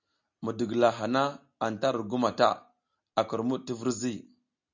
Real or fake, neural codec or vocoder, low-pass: real; none; 7.2 kHz